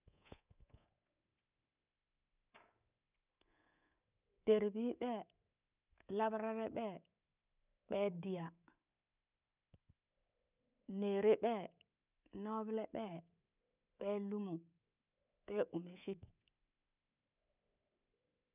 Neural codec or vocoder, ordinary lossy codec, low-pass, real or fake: codec, 24 kHz, 3.1 kbps, DualCodec; none; 3.6 kHz; fake